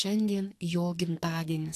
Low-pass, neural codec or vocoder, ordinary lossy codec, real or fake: 14.4 kHz; codec, 44.1 kHz, 2.6 kbps, SNAC; AAC, 64 kbps; fake